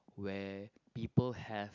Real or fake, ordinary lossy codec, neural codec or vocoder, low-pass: real; none; none; 7.2 kHz